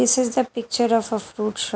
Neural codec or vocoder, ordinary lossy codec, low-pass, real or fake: none; none; none; real